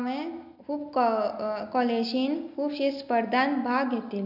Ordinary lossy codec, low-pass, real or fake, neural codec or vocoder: none; 5.4 kHz; real; none